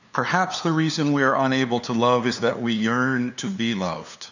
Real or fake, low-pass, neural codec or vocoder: fake; 7.2 kHz; codec, 16 kHz, 2 kbps, FunCodec, trained on LibriTTS, 25 frames a second